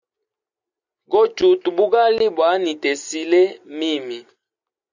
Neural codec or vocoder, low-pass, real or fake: none; 7.2 kHz; real